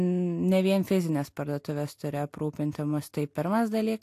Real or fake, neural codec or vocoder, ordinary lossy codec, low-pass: real; none; AAC, 64 kbps; 14.4 kHz